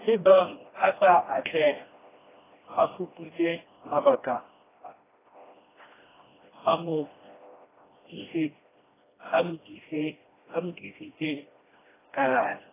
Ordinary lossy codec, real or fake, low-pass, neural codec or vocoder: AAC, 16 kbps; fake; 3.6 kHz; codec, 16 kHz, 1 kbps, FreqCodec, smaller model